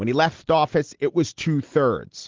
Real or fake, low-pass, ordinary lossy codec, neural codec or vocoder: real; 7.2 kHz; Opus, 16 kbps; none